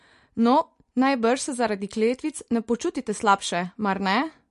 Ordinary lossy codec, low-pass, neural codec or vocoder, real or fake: MP3, 48 kbps; 10.8 kHz; none; real